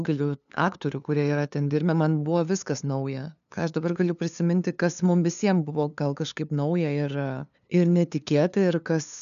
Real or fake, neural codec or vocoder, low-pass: fake; codec, 16 kHz, 2 kbps, FunCodec, trained on LibriTTS, 25 frames a second; 7.2 kHz